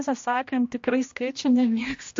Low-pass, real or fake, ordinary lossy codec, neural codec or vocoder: 7.2 kHz; fake; MP3, 48 kbps; codec, 16 kHz, 1 kbps, X-Codec, HuBERT features, trained on general audio